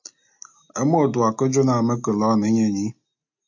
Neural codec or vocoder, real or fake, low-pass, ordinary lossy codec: none; real; 7.2 kHz; MP3, 48 kbps